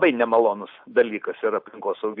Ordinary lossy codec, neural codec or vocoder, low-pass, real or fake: AAC, 48 kbps; none; 5.4 kHz; real